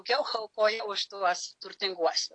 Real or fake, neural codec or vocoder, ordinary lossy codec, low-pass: real; none; AAC, 48 kbps; 9.9 kHz